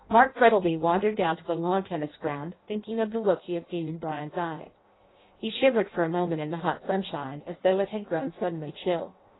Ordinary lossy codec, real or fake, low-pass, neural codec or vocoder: AAC, 16 kbps; fake; 7.2 kHz; codec, 16 kHz in and 24 kHz out, 0.6 kbps, FireRedTTS-2 codec